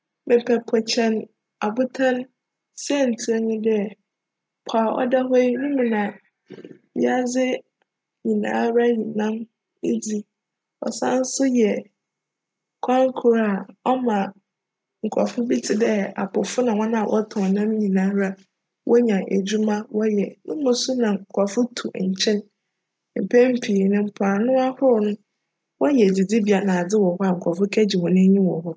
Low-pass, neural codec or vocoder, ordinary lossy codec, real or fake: none; none; none; real